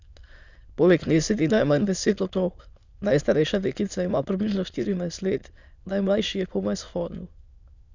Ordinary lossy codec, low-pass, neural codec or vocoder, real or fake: Opus, 64 kbps; 7.2 kHz; autoencoder, 22.05 kHz, a latent of 192 numbers a frame, VITS, trained on many speakers; fake